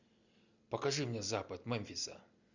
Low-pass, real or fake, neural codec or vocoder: 7.2 kHz; real; none